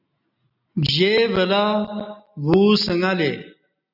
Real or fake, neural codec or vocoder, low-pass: real; none; 5.4 kHz